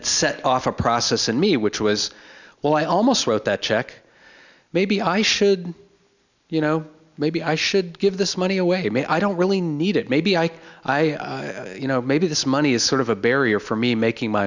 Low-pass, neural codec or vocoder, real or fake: 7.2 kHz; none; real